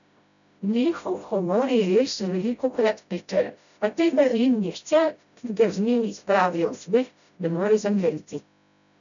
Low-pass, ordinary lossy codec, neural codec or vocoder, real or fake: 7.2 kHz; none; codec, 16 kHz, 0.5 kbps, FreqCodec, smaller model; fake